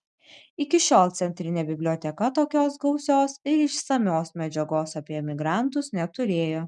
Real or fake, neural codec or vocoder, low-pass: real; none; 10.8 kHz